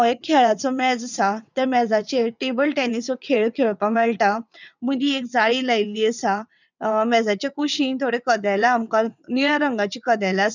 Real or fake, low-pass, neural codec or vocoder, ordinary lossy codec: fake; 7.2 kHz; vocoder, 22.05 kHz, 80 mel bands, WaveNeXt; none